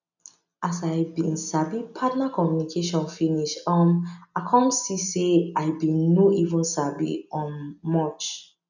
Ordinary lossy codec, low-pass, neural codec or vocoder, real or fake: none; 7.2 kHz; none; real